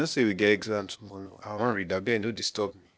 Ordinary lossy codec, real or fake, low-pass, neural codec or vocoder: none; fake; none; codec, 16 kHz, 0.8 kbps, ZipCodec